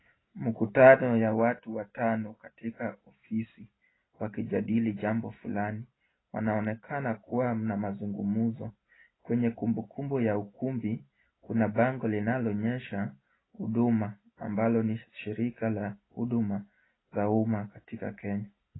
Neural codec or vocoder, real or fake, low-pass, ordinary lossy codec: none; real; 7.2 kHz; AAC, 16 kbps